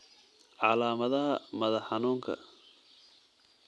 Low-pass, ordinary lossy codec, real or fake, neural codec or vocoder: none; none; real; none